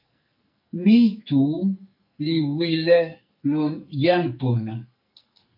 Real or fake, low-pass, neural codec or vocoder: fake; 5.4 kHz; codec, 44.1 kHz, 2.6 kbps, SNAC